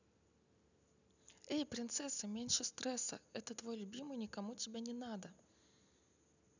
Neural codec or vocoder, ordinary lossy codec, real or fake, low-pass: none; none; real; 7.2 kHz